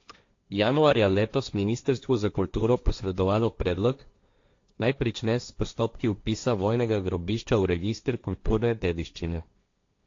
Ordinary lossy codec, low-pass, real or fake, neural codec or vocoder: AAC, 48 kbps; 7.2 kHz; fake; codec, 16 kHz, 1.1 kbps, Voila-Tokenizer